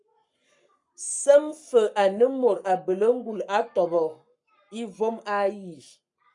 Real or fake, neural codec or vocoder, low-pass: fake; codec, 44.1 kHz, 7.8 kbps, Pupu-Codec; 10.8 kHz